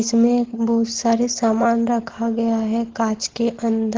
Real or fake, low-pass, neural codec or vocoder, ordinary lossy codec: real; 7.2 kHz; none; Opus, 16 kbps